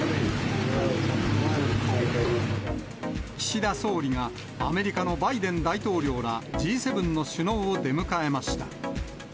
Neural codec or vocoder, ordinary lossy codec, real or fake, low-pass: none; none; real; none